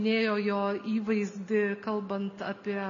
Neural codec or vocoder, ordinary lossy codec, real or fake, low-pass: none; MP3, 96 kbps; real; 7.2 kHz